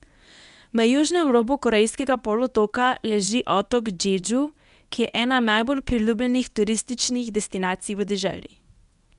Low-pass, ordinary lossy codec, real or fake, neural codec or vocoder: 10.8 kHz; none; fake; codec, 24 kHz, 0.9 kbps, WavTokenizer, medium speech release version 1